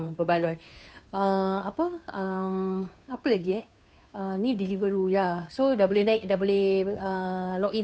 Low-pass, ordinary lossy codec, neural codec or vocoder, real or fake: none; none; codec, 16 kHz, 2 kbps, FunCodec, trained on Chinese and English, 25 frames a second; fake